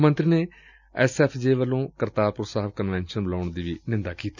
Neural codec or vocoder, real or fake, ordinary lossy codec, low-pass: none; real; none; 7.2 kHz